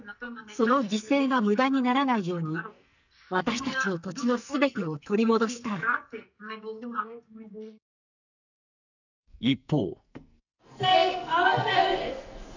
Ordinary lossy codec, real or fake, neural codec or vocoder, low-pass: none; fake; codec, 44.1 kHz, 2.6 kbps, SNAC; 7.2 kHz